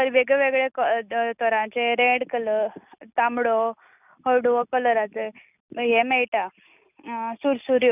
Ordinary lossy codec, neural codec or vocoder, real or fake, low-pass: none; none; real; 3.6 kHz